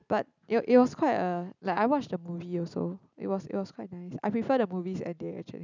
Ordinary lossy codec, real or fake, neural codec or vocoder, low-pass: none; real; none; 7.2 kHz